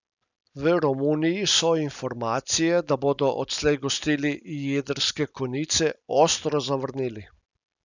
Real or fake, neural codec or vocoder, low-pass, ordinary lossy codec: real; none; 7.2 kHz; none